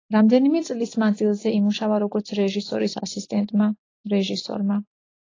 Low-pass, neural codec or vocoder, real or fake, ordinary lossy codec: 7.2 kHz; none; real; AAC, 32 kbps